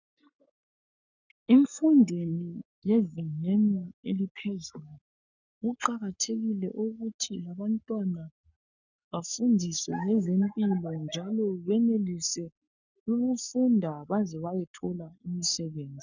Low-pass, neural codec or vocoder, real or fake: 7.2 kHz; codec, 44.1 kHz, 7.8 kbps, Pupu-Codec; fake